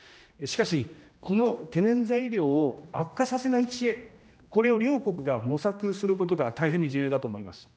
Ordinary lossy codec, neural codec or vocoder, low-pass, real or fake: none; codec, 16 kHz, 1 kbps, X-Codec, HuBERT features, trained on general audio; none; fake